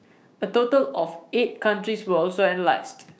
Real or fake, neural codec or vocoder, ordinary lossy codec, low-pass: fake; codec, 16 kHz, 6 kbps, DAC; none; none